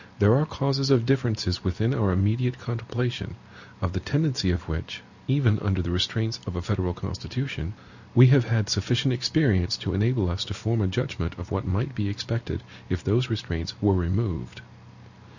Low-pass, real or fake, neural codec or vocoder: 7.2 kHz; real; none